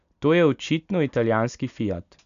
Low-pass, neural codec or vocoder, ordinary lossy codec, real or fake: 7.2 kHz; none; none; real